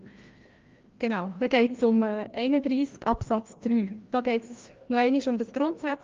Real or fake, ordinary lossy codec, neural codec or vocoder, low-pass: fake; Opus, 32 kbps; codec, 16 kHz, 1 kbps, FreqCodec, larger model; 7.2 kHz